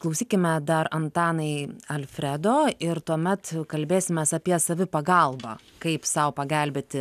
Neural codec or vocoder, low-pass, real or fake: none; 14.4 kHz; real